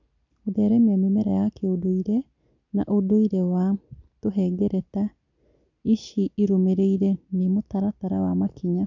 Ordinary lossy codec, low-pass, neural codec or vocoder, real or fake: none; 7.2 kHz; none; real